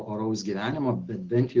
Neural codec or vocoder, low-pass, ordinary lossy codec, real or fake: none; 7.2 kHz; Opus, 16 kbps; real